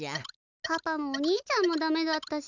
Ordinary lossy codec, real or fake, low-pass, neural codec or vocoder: none; real; 7.2 kHz; none